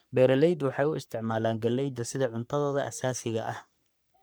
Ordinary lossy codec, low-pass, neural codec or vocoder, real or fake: none; none; codec, 44.1 kHz, 3.4 kbps, Pupu-Codec; fake